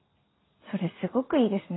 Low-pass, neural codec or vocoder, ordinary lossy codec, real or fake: 7.2 kHz; none; AAC, 16 kbps; real